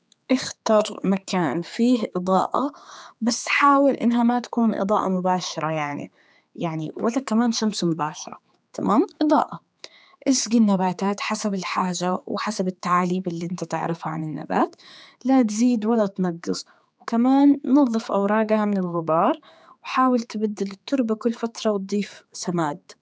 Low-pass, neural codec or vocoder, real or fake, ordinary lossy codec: none; codec, 16 kHz, 4 kbps, X-Codec, HuBERT features, trained on general audio; fake; none